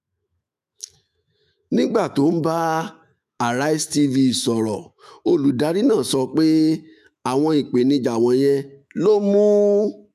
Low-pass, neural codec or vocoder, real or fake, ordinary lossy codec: 14.4 kHz; autoencoder, 48 kHz, 128 numbers a frame, DAC-VAE, trained on Japanese speech; fake; none